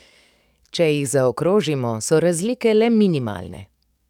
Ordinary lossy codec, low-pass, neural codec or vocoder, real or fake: none; 19.8 kHz; codec, 44.1 kHz, 7.8 kbps, DAC; fake